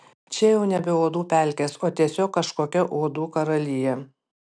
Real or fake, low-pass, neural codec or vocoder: real; 9.9 kHz; none